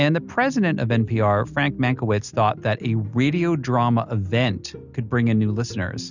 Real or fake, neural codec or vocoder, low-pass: real; none; 7.2 kHz